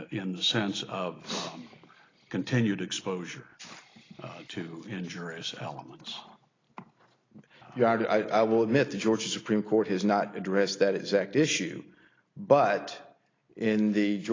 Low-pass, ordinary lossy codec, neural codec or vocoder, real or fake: 7.2 kHz; AAC, 32 kbps; none; real